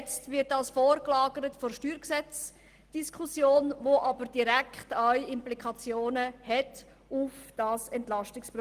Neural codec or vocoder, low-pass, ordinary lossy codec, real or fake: none; 14.4 kHz; Opus, 24 kbps; real